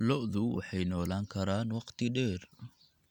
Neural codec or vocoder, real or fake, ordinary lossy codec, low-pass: none; real; none; 19.8 kHz